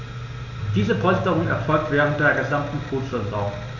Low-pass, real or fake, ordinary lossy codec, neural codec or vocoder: 7.2 kHz; real; none; none